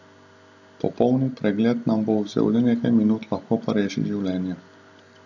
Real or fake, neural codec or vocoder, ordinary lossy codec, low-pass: real; none; none; 7.2 kHz